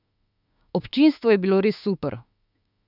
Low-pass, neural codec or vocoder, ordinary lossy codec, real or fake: 5.4 kHz; autoencoder, 48 kHz, 32 numbers a frame, DAC-VAE, trained on Japanese speech; none; fake